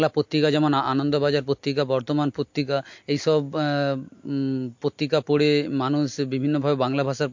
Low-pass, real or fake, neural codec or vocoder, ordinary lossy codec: 7.2 kHz; real; none; MP3, 48 kbps